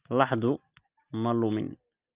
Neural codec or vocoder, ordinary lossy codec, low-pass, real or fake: none; Opus, 32 kbps; 3.6 kHz; real